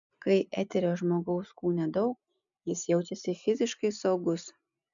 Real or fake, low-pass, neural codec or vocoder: real; 7.2 kHz; none